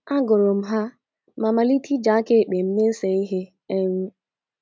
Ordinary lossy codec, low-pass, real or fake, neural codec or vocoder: none; none; real; none